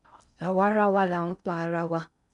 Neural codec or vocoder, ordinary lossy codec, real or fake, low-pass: codec, 16 kHz in and 24 kHz out, 0.6 kbps, FocalCodec, streaming, 4096 codes; none; fake; 10.8 kHz